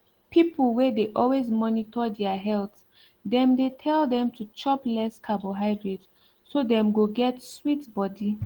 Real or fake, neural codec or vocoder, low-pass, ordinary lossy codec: real; none; 19.8 kHz; Opus, 16 kbps